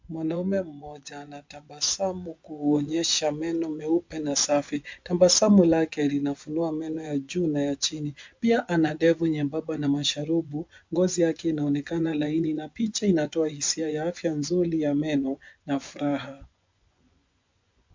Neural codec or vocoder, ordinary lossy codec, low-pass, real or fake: vocoder, 24 kHz, 100 mel bands, Vocos; MP3, 64 kbps; 7.2 kHz; fake